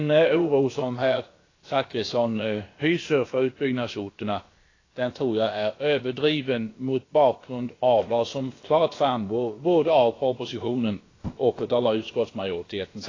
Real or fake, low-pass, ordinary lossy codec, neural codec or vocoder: fake; 7.2 kHz; AAC, 32 kbps; codec, 16 kHz, about 1 kbps, DyCAST, with the encoder's durations